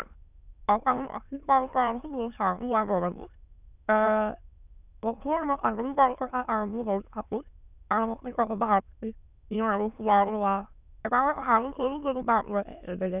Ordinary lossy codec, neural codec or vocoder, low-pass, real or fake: none; autoencoder, 22.05 kHz, a latent of 192 numbers a frame, VITS, trained on many speakers; 3.6 kHz; fake